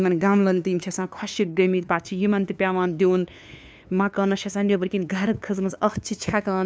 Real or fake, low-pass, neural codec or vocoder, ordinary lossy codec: fake; none; codec, 16 kHz, 2 kbps, FunCodec, trained on LibriTTS, 25 frames a second; none